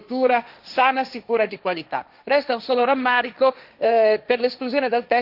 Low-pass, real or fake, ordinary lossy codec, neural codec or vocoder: 5.4 kHz; fake; none; codec, 16 kHz, 1.1 kbps, Voila-Tokenizer